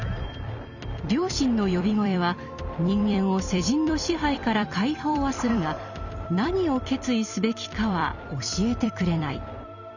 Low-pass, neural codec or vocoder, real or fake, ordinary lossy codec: 7.2 kHz; vocoder, 44.1 kHz, 128 mel bands every 256 samples, BigVGAN v2; fake; none